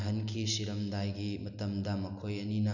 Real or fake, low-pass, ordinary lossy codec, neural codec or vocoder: real; 7.2 kHz; none; none